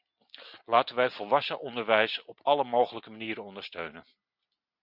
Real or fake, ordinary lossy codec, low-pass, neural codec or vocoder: real; Opus, 64 kbps; 5.4 kHz; none